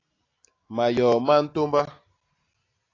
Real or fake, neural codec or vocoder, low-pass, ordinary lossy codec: real; none; 7.2 kHz; AAC, 32 kbps